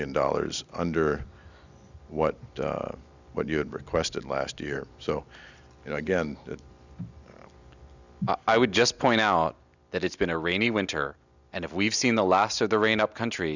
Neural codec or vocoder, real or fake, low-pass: none; real; 7.2 kHz